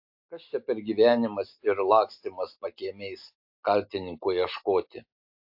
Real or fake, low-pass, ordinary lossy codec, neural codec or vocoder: real; 5.4 kHz; AAC, 48 kbps; none